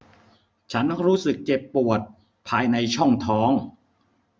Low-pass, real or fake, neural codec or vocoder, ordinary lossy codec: none; real; none; none